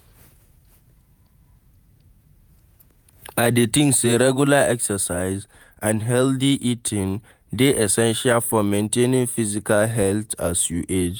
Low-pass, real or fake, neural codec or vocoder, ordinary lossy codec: none; fake; vocoder, 48 kHz, 128 mel bands, Vocos; none